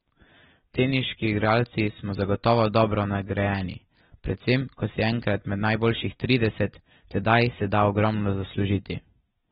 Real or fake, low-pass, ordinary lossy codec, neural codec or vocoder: real; 19.8 kHz; AAC, 16 kbps; none